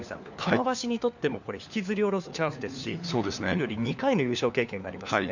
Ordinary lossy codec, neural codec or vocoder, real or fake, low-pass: none; codec, 16 kHz, 4 kbps, FunCodec, trained on LibriTTS, 50 frames a second; fake; 7.2 kHz